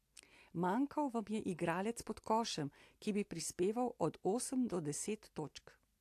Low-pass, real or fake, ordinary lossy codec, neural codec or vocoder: 14.4 kHz; real; AAC, 64 kbps; none